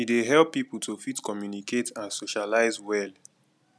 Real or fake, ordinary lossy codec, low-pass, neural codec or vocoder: real; none; none; none